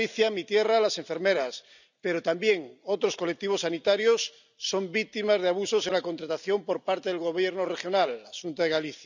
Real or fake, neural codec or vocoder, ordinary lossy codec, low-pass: real; none; none; 7.2 kHz